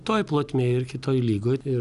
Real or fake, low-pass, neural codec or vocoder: real; 10.8 kHz; none